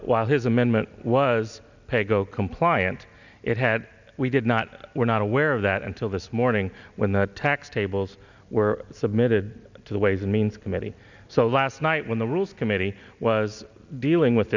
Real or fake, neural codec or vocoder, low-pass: real; none; 7.2 kHz